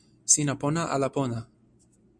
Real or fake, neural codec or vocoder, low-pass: real; none; 9.9 kHz